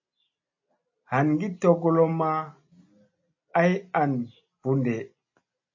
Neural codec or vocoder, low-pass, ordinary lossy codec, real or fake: none; 7.2 kHz; MP3, 32 kbps; real